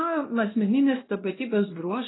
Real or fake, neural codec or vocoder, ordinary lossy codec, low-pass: fake; codec, 16 kHz, 1 kbps, X-Codec, WavLM features, trained on Multilingual LibriSpeech; AAC, 16 kbps; 7.2 kHz